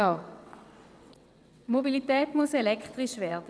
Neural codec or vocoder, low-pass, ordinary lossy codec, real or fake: vocoder, 22.05 kHz, 80 mel bands, WaveNeXt; none; none; fake